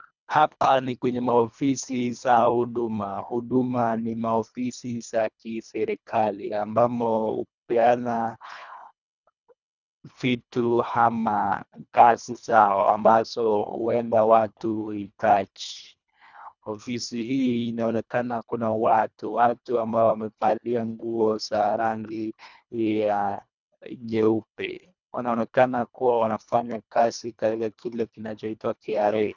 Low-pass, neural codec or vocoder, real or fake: 7.2 kHz; codec, 24 kHz, 1.5 kbps, HILCodec; fake